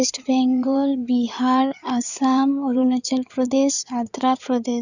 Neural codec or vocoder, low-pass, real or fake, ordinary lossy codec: codec, 16 kHz in and 24 kHz out, 2.2 kbps, FireRedTTS-2 codec; 7.2 kHz; fake; none